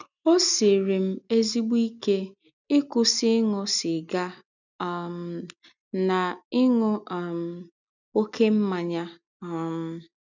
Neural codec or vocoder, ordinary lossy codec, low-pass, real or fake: none; none; 7.2 kHz; real